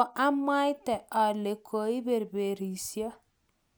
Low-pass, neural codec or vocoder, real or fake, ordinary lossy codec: none; none; real; none